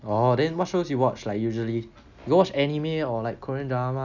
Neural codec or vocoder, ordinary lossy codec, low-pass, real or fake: none; none; 7.2 kHz; real